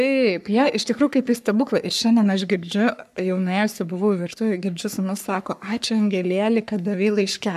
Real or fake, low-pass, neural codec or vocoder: fake; 14.4 kHz; codec, 44.1 kHz, 3.4 kbps, Pupu-Codec